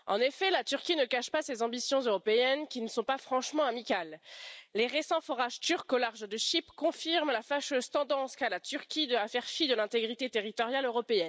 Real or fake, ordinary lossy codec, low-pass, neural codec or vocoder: real; none; none; none